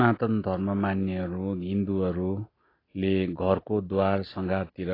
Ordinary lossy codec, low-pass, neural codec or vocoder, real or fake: AAC, 24 kbps; 5.4 kHz; none; real